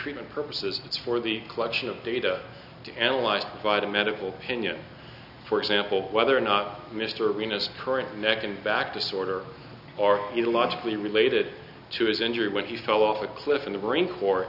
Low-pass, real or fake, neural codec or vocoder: 5.4 kHz; real; none